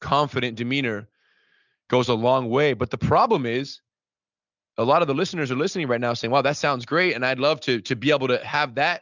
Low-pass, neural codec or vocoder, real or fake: 7.2 kHz; none; real